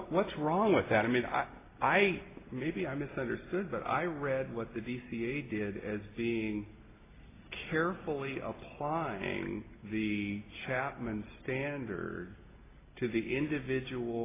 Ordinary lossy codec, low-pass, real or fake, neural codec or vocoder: AAC, 16 kbps; 3.6 kHz; real; none